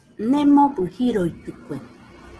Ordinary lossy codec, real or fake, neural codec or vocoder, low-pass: Opus, 16 kbps; real; none; 10.8 kHz